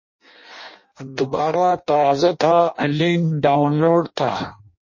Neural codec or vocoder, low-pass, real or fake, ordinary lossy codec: codec, 16 kHz in and 24 kHz out, 0.6 kbps, FireRedTTS-2 codec; 7.2 kHz; fake; MP3, 32 kbps